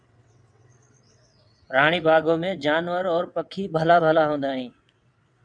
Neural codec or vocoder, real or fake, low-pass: vocoder, 22.05 kHz, 80 mel bands, WaveNeXt; fake; 9.9 kHz